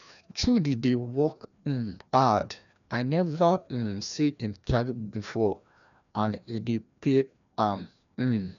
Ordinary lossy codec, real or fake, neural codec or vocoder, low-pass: none; fake; codec, 16 kHz, 1 kbps, FreqCodec, larger model; 7.2 kHz